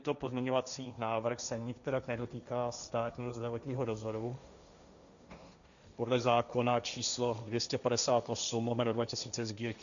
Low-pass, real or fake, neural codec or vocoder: 7.2 kHz; fake; codec, 16 kHz, 1.1 kbps, Voila-Tokenizer